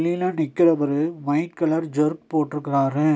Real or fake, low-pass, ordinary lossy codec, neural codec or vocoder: real; none; none; none